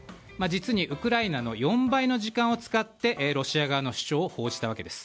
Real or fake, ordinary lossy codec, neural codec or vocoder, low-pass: real; none; none; none